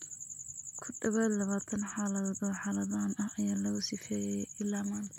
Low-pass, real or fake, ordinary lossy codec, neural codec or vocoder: 19.8 kHz; real; MP3, 96 kbps; none